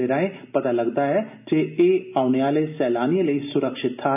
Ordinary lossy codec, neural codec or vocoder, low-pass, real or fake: none; none; 3.6 kHz; real